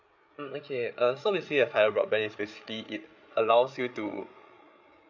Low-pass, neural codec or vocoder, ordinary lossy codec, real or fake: 7.2 kHz; codec, 16 kHz, 8 kbps, FreqCodec, larger model; none; fake